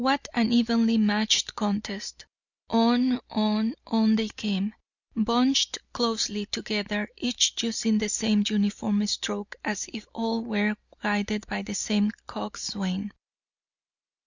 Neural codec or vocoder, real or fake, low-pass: none; real; 7.2 kHz